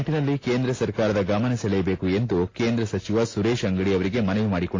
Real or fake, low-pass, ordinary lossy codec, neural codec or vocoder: real; 7.2 kHz; AAC, 32 kbps; none